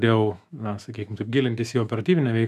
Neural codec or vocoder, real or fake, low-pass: autoencoder, 48 kHz, 128 numbers a frame, DAC-VAE, trained on Japanese speech; fake; 14.4 kHz